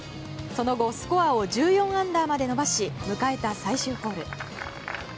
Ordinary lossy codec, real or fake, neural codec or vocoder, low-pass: none; real; none; none